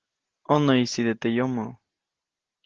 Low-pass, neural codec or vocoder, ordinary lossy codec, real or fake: 7.2 kHz; none; Opus, 16 kbps; real